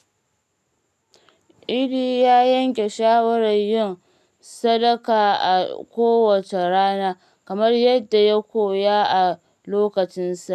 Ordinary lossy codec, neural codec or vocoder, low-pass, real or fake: none; none; 14.4 kHz; real